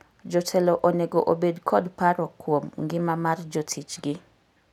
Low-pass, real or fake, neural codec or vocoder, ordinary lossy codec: 19.8 kHz; real; none; none